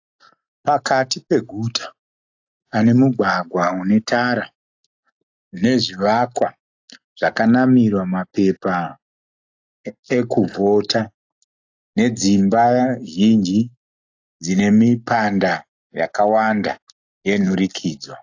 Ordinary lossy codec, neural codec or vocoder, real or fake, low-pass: AAC, 48 kbps; none; real; 7.2 kHz